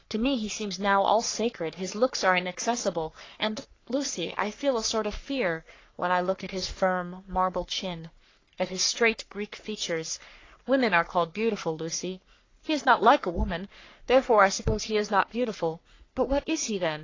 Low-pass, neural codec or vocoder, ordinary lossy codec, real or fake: 7.2 kHz; codec, 44.1 kHz, 3.4 kbps, Pupu-Codec; AAC, 32 kbps; fake